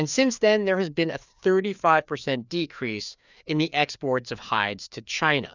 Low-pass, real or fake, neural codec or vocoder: 7.2 kHz; fake; codec, 16 kHz, 2 kbps, FreqCodec, larger model